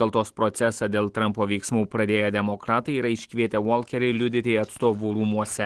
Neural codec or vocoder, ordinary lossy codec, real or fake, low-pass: autoencoder, 48 kHz, 128 numbers a frame, DAC-VAE, trained on Japanese speech; Opus, 16 kbps; fake; 10.8 kHz